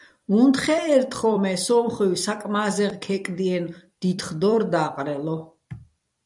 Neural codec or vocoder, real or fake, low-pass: vocoder, 44.1 kHz, 128 mel bands every 256 samples, BigVGAN v2; fake; 10.8 kHz